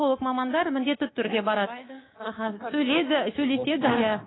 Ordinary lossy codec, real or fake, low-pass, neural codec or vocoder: AAC, 16 kbps; fake; 7.2 kHz; codec, 24 kHz, 3.1 kbps, DualCodec